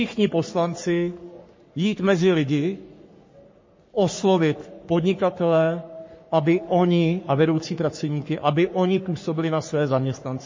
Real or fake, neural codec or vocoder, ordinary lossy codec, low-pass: fake; codec, 44.1 kHz, 3.4 kbps, Pupu-Codec; MP3, 32 kbps; 7.2 kHz